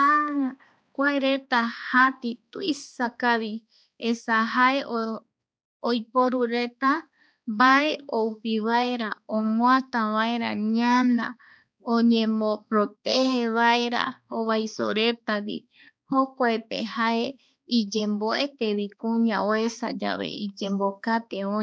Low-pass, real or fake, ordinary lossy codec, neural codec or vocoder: none; fake; none; codec, 16 kHz, 2 kbps, X-Codec, HuBERT features, trained on balanced general audio